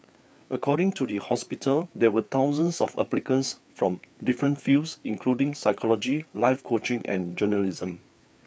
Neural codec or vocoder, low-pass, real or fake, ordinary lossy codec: codec, 16 kHz, 4 kbps, FreqCodec, larger model; none; fake; none